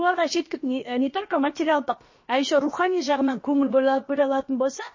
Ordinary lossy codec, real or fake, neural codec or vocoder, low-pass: MP3, 32 kbps; fake; codec, 16 kHz, about 1 kbps, DyCAST, with the encoder's durations; 7.2 kHz